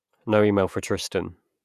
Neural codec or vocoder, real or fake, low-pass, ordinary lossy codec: vocoder, 48 kHz, 128 mel bands, Vocos; fake; 14.4 kHz; none